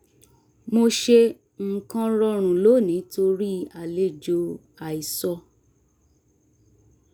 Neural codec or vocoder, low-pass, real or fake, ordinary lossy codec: none; none; real; none